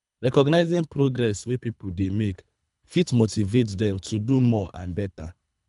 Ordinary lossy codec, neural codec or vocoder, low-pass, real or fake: none; codec, 24 kHz, 3 kbps, HILCodec; 10.8 kHz; fake